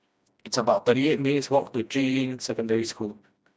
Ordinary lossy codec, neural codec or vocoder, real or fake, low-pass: none; codec, 16 kHz, 1 kbps, FreqCodec, smaller model; fake; none